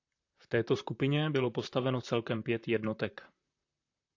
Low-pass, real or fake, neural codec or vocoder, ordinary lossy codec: 7.2 kHz; fake; vocoder, 44.1 kHz, 128 mel bands, Pupu-Vocoder; AAC, 48 kbps